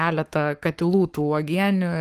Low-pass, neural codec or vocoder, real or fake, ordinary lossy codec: 14.4 kHz; codec, 44.1 kHz, 7.8 kbps, Pupu-Codec; fake; Opus, 24 kbps